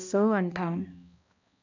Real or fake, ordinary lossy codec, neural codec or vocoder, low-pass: fake; none; codec, 16 kHz, 1 kbps, X-Codec, HuBERT features, trained on balanced general audio; 7.2 kHz